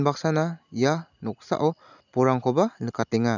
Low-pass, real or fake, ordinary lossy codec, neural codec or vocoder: 7.2 kHz; real; none; none